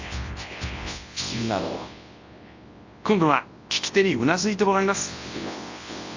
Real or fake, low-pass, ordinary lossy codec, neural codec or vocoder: fake; 7.2 kHz; AAC, 48 kbps; codec, 24 kHz, 0.9 kbps, WavTokenizer, large speech release